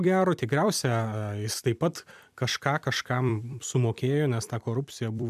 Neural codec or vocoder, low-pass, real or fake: vocoder, 44.1 kHz, 128 mel bands every 256 samples, BigVGAN v2; 14.4 kHz; fake